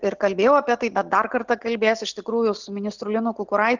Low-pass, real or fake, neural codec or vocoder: 7.2 kHz; real; none